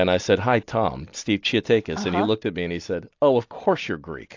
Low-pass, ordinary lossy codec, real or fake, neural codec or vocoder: 7.2 kHz; AAC, 48 kbps; real; none